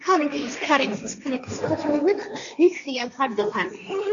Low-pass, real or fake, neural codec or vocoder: 7.2 kHz; fake; codec, 16 kHz, 1.1 kbps, Voila-Tokenizer